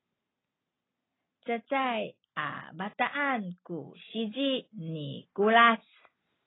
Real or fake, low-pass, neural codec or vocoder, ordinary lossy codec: real; 7.2 kHz; none; AAC, 16 kbps